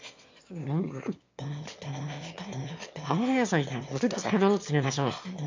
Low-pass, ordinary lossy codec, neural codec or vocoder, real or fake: 7.2 kHz; MP3, 48 kbps; autoencoder, 22.05 kHz, a latent of 192 numbers a frame, VITS, trained on one speaker; fake